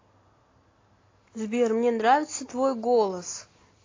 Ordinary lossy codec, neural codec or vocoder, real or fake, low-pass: AAC, 32 kbps; none; real; 7.2 kHz